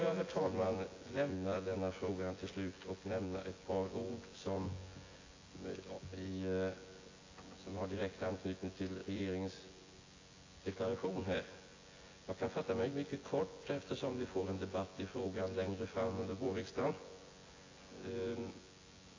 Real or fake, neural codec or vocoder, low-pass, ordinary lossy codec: fake; vocoder, 24 kHz, 100 mel bands, Vocos; 7.2 kHz; AAC, 32 kbps